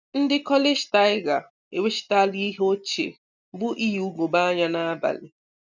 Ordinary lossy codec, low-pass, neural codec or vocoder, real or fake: none; 7.2 kHz; none; real